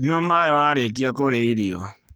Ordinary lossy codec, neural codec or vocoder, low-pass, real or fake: none; codec, 44.1 kHz, 2.6 kbps, SNAC; none; fake